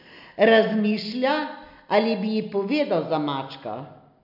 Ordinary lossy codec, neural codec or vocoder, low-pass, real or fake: none; none; 5.4 kHz; real